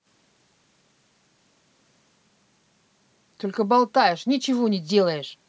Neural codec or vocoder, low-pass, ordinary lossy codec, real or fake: none; none; none; real